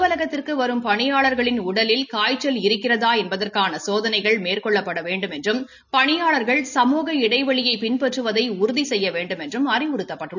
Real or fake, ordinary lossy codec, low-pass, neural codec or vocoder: real; none; 7.2 kHz; none